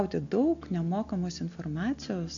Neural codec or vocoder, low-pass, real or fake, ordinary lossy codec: none; 7.2 kHz; real; AAC, 48 kbps